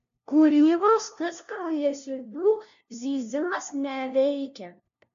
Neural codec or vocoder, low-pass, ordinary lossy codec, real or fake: codec, 16 kHz, 0.5 kbps, FunCodec, trained on LibriTTS, 25 frames a second; 7.2 kHz; AAC, 64 kbps; fake